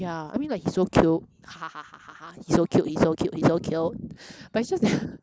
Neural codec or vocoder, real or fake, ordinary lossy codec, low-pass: none; real; none; none